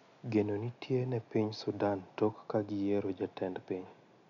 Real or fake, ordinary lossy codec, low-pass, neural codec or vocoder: real; none; 7.2 kHz; none